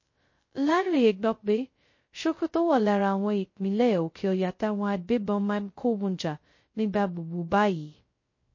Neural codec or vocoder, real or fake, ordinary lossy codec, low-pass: codec, 16 kHz, 0.2 kbps, FocalCodec; fake; MP3, 32 kbps; 7.2 kHz